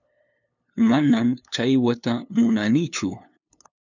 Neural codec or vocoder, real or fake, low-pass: codec, 16 kHz, 2 kbps, FunCodec, trained on LibriTTS, 25 frames a second; fake; 7.2 kHz